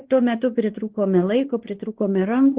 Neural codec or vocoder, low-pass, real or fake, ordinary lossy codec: codec, 16 kHz, 2 kbps, X-Codec, WavLM features, trained on Multilingual LibriSpeech; 3.6 kHz; fake; Opus, 16 kbps